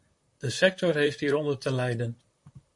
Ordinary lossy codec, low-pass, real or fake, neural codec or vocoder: MP3, 48 kbps; 10.8 kHz; fake; vocoder, 44.1 kHz, 128 mel bands, Pupu-Vocoder